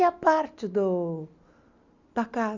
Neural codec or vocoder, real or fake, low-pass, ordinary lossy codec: none; real; 7.2 kHz; Opus, 64 kbps